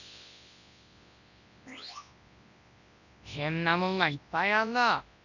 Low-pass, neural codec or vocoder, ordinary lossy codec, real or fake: 7.2 kHz; codec, 24 kHz, 0.9 kbps, WavTokenizer, large speech release; none; fake